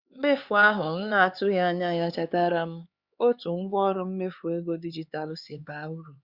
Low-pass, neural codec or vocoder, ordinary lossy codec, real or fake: 5.4 kHz; codec, 16 kHz, 4 kbps, X-Codec, HuBERT features, trained on LibriSpeech; Opus, 64 kbps; fake